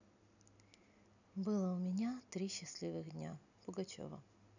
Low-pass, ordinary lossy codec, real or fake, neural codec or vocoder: 7.2 kHz; none; real; none